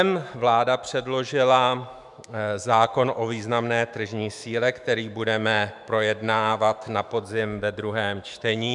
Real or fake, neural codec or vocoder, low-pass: fake; autoencoder, 48 kHz, 128 numbers a frame, DAC-VAE, trained on Japanese speech; 10.8 kHz